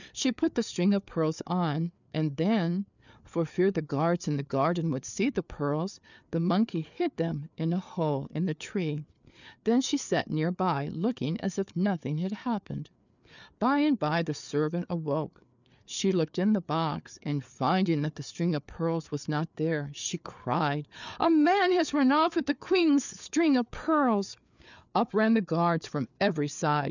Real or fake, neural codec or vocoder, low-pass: fake; codec, 16 kHz, 4 kbps, FreqCodec, larger model; 7.2 kHz